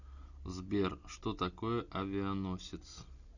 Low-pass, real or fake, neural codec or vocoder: 7.2 kHz; real; none